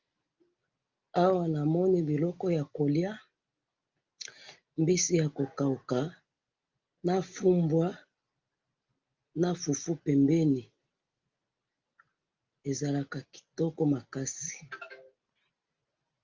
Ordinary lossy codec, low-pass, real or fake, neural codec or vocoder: Opus, 24 kbps; 7.2 kHz; real; none